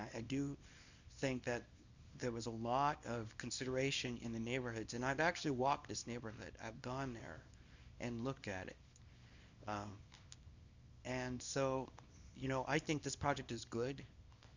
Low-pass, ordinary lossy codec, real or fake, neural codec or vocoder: 7.2 kHz; Opus, 64 kbps; fake; codec, 24 kHz, 0.9 kbps, WavTokenizer, small release